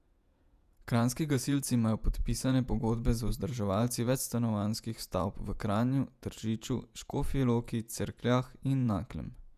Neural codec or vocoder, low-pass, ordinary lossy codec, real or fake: vocoder, 48 kHz, 128 mel bands, Vocos; 14.4 kHz; none; fake